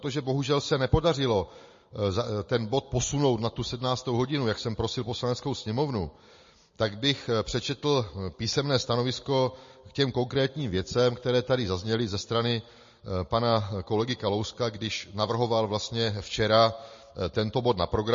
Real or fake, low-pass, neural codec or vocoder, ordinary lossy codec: real; 7.2 kHz; none; MP3, 32 kbps